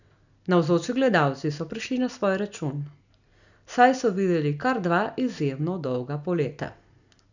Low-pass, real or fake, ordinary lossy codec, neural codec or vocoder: 7.2 kHz; real; none; none